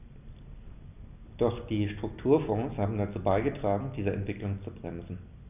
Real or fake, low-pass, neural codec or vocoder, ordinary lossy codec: real; 3.6 kHz; none; none